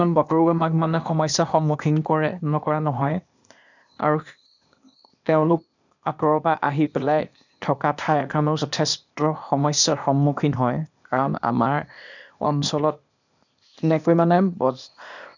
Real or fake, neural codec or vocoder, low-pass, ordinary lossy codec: fake; codec, 16 kHz, 0.8 kbps, ZipCodec; 7.2 kHz; none